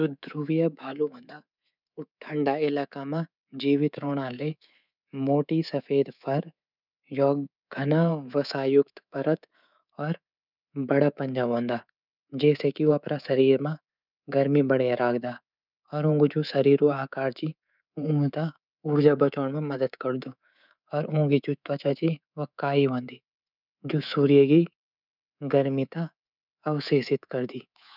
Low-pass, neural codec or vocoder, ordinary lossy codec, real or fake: 5.4 kHz; codec, 24 kHz, 3.1 kbps, DualCodec; none; fake